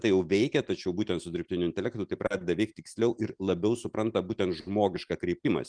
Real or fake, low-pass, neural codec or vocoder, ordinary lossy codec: real; 9.9 kHz; none; Opus, 32 kbps